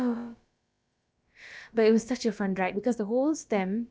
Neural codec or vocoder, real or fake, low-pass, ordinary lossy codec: codec, 16 kHz, about 1 kbps, DyCAST, with the encoder's durations; fake; none; none